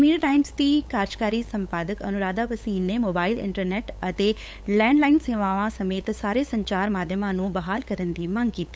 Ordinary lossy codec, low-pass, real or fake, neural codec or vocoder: none; none; fake; codec, 16 kHz, 8 kbps, FunCodec, trained on LibriTTS, 25 frames a second